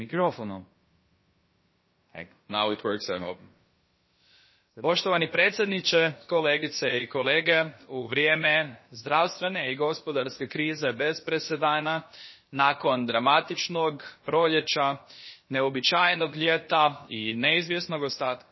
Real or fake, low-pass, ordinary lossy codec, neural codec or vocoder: fake; 7.2 kHz; MP3, 24 kbps; codec, 16 kHz, about 1 kbps, DyCAST, with the encoder's durations